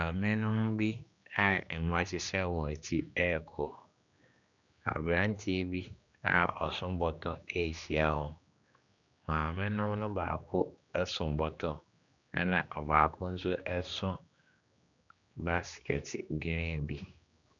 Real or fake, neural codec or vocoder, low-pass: fake; codec, 16 kHz, 2 kbps, X-Codec, HuBERT features, trained on general audio; 7.2 kHz